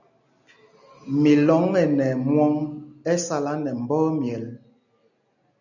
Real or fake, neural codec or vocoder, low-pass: real; none; 7.2 kHz